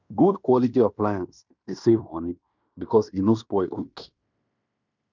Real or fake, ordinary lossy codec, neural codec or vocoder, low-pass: fake; none; codec, 16 kHz in and 24 kHz out, 0.9 kbps, LongCat-Audio-Codec, fine tuned four codebook decoder; 7.2 kHz